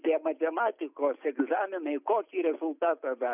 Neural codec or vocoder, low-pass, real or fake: none; 3.6 kHz; real